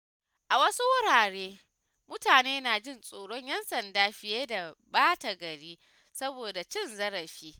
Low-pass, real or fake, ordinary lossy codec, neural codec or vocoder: none; real; none; none